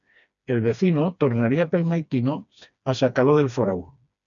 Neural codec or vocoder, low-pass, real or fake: codec, 16 kHz, 2 kbps, FreqCodec, smaller model; 7.2 kHz; fake